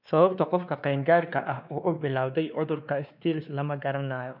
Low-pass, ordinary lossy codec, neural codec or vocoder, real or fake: 5.4 kHz; none; codec, 16 kHz, 2 kbps, X-Codec, WavLM features, trained on Multilingual LibriSpeech; fake